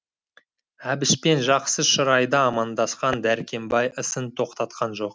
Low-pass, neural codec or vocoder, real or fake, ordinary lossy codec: none; none; real; none